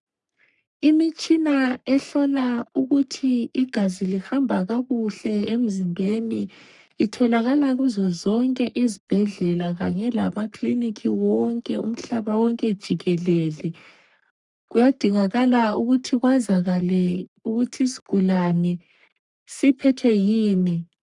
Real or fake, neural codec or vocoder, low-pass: fake; codec, 44.1 kHz, 3.4 kbps, Pupu-Codec; 10.8 kHz